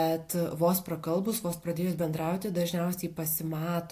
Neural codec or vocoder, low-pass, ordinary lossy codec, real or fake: none; 14.4 kHz; AAC, 64 kbps; real